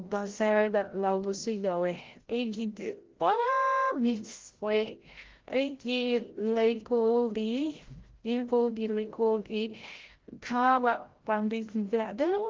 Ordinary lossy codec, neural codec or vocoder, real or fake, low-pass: Opus, 16 kbps; codec, 16 kHz, 0.5 kbps, FreqCodec, larger model; fake; 7.2 kHz